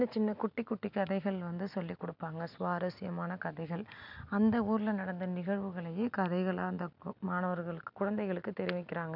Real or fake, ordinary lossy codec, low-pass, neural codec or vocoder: real; none; 5.4 kHz; none